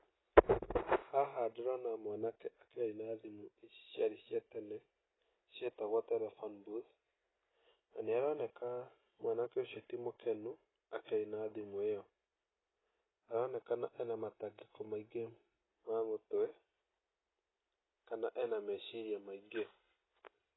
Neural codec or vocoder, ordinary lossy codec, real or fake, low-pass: none; AAC, 16 kbps; real; 7.2 kHz